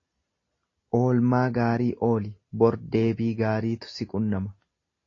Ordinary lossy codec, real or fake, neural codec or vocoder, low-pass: AAC, 32 kbps; real; none; 7.2 kHz